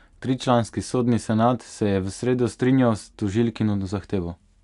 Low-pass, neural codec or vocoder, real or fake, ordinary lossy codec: 10.8 kHz; none; real; none